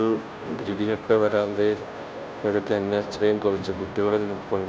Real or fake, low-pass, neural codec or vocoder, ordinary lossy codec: fake; none; codec, 16 kHz, 0.5 kbps, FunCodec, trained on Chinese and English, 25 frames a second; none